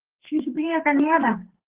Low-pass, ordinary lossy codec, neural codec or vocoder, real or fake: 3.6 kHz; Opus, 16 kbps; codec, 32 kHz, 1.9 kbps, SNAC; fake